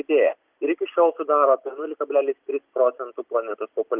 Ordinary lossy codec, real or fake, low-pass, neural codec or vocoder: Opus, 32 kbps; real; 3.6 kHz; none